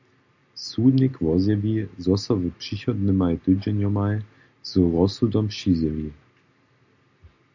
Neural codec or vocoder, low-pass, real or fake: none; 7.2 kHz; real